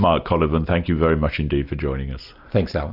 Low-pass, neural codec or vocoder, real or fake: 5.4 kHz; none; real